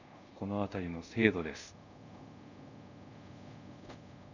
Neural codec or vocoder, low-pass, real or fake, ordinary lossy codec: codec, 24 kHz, 0.5 kbps, DualCodec; 7.2 kHz; fake; none